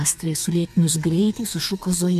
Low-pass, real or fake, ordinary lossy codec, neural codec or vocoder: 14.4 kHz; fake; MP3, 96 kbps; codec, 32 kHz, 1.9 kbps, SNAC